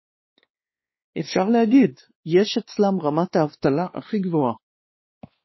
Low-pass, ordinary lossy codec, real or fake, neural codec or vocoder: 7.2 kHz; MP3, 24 kbps; fake; codec, 16 kHz, 2 kbps, X-Codec, WavLM features, trained on Multilingual LibriSpeech